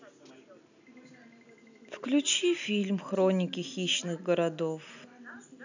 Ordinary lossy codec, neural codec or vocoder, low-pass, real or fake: none; none; 7.2 kHz; real